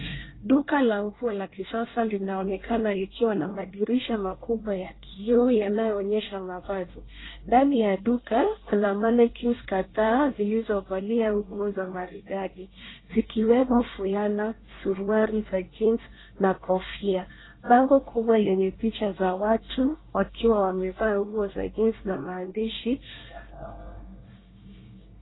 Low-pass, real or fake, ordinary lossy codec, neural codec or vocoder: 7.2 kHz; fake; AAC, 16 kbps; codec, 24 kHz, 1 kbps, SNAC